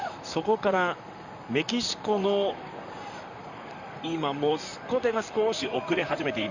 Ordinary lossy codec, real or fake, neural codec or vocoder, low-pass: none; fake; vocoder, 44.1 kHz, 128 mel bands, Pupu-Vocoder; 7.2 kHz